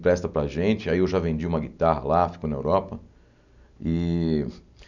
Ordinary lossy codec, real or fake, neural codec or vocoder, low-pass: none; real; none; 7.2 kHz